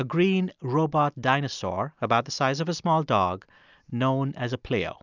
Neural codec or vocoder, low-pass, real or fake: none; 7.2 kHz; real